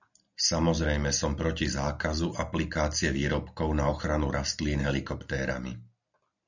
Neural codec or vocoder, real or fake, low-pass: none; real; 7.2 kHz